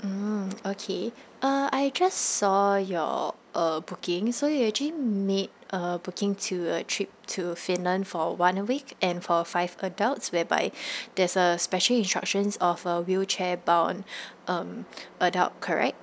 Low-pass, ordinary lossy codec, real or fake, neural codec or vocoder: none; none; real; none